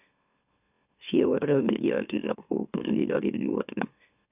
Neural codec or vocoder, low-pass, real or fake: autoencoder, 44.1 kHz, a latent of 192 numbers a frame, MeloTTS; 3.6 kHz; fake